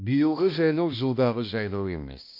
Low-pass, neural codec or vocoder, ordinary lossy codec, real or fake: 5.4 kHz; codec, 16 kHz, 1 kbps, X-Codec, HuBERT features, trained on balanced general audio; MP3, 48 kbps; fake